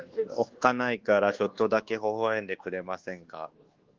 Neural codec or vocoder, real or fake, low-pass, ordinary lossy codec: codec, 24 kHz, 1.2 kbps, DualCodec; fake; 7.2 kHz; Opus, 16 kbps